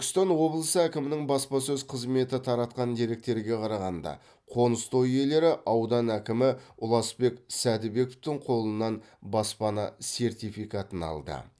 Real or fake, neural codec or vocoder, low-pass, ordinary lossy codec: real; none; none; none